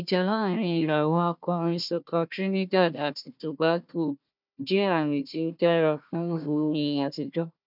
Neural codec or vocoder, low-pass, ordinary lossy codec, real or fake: codec, 16 kHz, 1 kbps, FunCodec, trained on Chinese and English, 50 frames a second; 5.4 kHz; none; fake